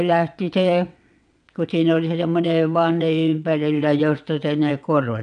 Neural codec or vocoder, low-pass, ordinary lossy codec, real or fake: vocoder, 22.05 kHz, 80 mel bands, WaveNeXt; 9.9 kHz; none; fake